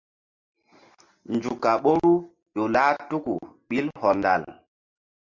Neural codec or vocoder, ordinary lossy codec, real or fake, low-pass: none; MP3, 64 kbps; real; 7.2 kHz